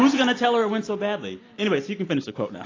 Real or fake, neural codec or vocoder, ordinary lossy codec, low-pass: real; none; AAC, 32 kbps; 7.2 kHz